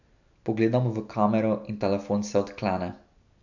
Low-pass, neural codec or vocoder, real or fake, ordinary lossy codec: 7.2 kHz; none; real; none